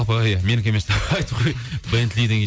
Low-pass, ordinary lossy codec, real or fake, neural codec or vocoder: none; none; real; none